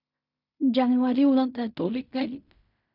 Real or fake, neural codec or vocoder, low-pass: fake; codec, 16 kHz in and 24 kHz out, 0.4 kbps, LongCat-Audio-Codec, fine tuned four codebook decoder; 5.4 kHz